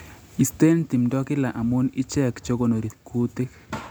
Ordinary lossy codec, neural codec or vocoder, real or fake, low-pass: none; none; real; none